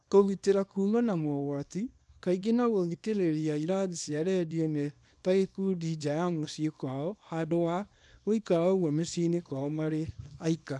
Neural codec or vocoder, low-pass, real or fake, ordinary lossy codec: codec, 24 kHz, 0.9 kbps, WavTokenizer, small release; none; fake; none